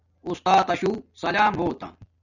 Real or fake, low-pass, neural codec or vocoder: real; 7.2 kHz; none